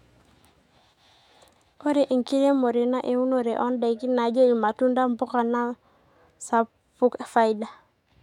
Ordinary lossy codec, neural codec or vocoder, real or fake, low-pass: MP3, 96 kbps; autoencoder, 48 kHz, 128 numbers a frame, DAC-VAE, trained on Japanese speech; fake; 19.8 kHz